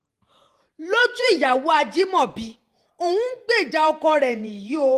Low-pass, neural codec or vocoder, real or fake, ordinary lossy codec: 14.4 kHz; vocoder, 48 kHz, 128 mel bands, Vocos; fake; Opus, 24 kbps